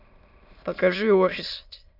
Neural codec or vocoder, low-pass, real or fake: autoencoder, 22.05 kHz, a latent of 192 numbers a frame, VITS, trained on many speakers; 5.4 kHz; fake